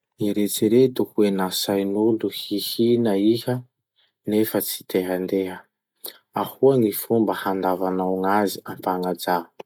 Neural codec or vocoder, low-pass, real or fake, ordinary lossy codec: none; 19.8 kHz; real; none